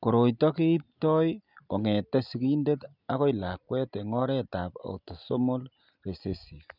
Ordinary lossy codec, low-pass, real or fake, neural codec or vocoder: none; 5.4 kHz; real; none